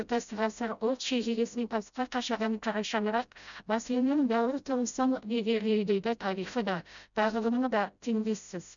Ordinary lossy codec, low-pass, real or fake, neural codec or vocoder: none; 7.2 kHz; fake; codec, 16 kHz, 0.5 kbps, FreqCodec, smaller model